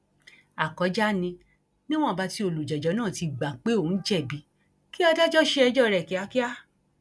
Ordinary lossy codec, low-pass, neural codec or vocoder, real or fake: none; none; none; real